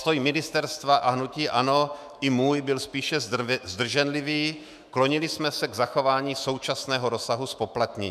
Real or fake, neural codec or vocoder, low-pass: fake; autoencoder, 48 kHz, 128 numbers a frame, DAC-VAE, trained on Japanese speech; 14.4 kHz